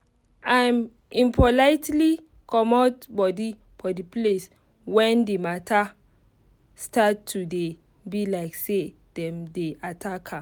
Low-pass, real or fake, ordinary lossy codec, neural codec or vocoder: none; real; none; none